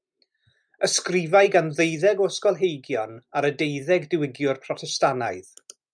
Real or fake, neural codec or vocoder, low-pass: real; none; 9.9 kHz